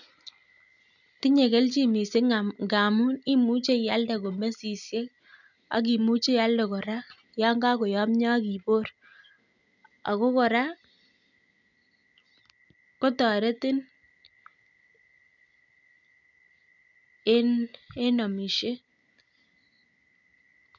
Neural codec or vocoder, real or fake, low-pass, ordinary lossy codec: none; real; 7.2 kHz; none